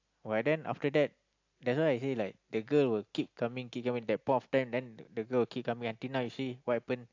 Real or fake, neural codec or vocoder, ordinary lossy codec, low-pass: real; none; AAC, 48 kbps; 7.2 kHz